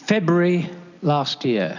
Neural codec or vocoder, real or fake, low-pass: none; real; 7.2 kHz